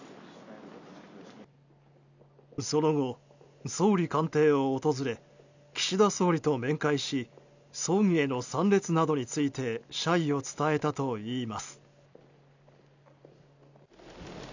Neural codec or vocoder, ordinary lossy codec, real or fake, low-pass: none; none; real; 7.2 kHz